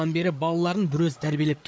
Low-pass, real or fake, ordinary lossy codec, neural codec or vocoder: none; fake; none; codec, 16 kHz, 8 kbps, FreqCodec, larger model